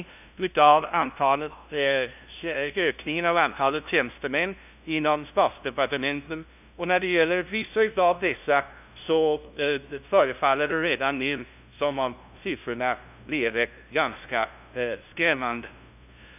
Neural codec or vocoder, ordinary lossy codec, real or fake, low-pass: codec, 16 kHz, 0.5 kbps, FunCodec, trained on LibriTTS, 25 frames a second; none; fake; 3.6 kHz